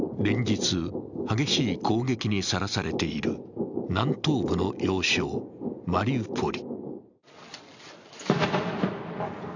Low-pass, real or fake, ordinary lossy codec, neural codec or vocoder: 7.2 kHz; real; none; none